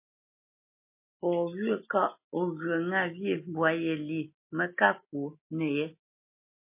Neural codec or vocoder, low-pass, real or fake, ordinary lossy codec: none; 3.6 kHz; real; MP3, 24 kbps